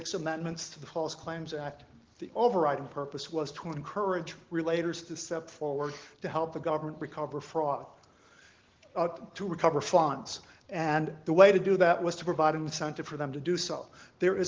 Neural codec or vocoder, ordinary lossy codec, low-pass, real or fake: none; Opus, 16 kbps; 7.2 kHz; real